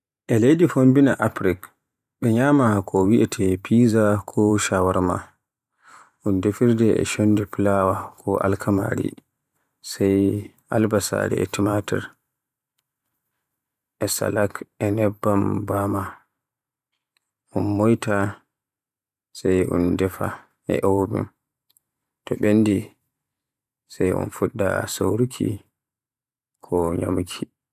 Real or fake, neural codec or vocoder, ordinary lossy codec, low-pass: fake; vocoder, 44.1 kHz, 128 mel bands every 512 samples, BigVGAN v2; none; 14.4 kHz